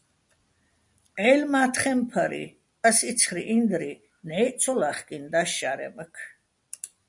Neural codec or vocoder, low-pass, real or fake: none; 10.8 kHz; real